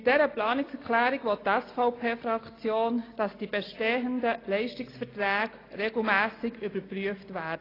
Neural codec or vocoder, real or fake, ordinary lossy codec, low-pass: none; real; AAC, 24 kbps; 5.4 kHz